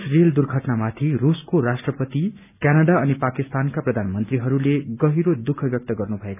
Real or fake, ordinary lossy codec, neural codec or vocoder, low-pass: real; none; none; 3.6 kHz